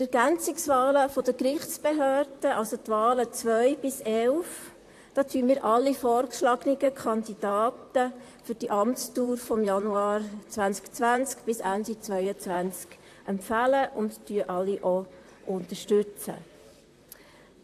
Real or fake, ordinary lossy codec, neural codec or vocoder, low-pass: fake; AAC, 64 kbps; vocoder, 44.1 kHz, 128 mel bands, Pupu-Vocoder; 14.4 kHz